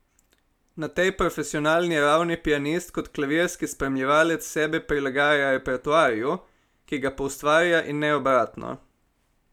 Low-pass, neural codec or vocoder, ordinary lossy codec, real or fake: 19.8 kHz; none; none; real